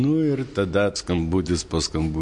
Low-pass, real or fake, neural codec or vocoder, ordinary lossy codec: 10.8 kHz; real; none; MP3, 48 kbps